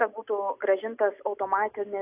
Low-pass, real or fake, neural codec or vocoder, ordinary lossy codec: 3.6 kHz; real; none; AAC, 32 kbps